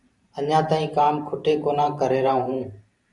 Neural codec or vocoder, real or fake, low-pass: vocoder, 44.1 kHz, 128 mel bands every 512 samples, BigVGAN v2; fake; 10.8 kHz